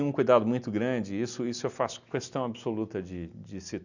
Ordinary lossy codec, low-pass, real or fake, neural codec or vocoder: none; 7.2 kHz; real; none